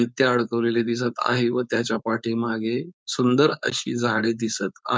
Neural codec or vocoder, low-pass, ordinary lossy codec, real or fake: codec, 16 kHz, 4.8 kbps, FACodec; none; none; fake